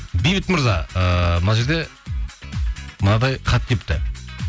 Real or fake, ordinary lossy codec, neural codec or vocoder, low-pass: real; none; none; none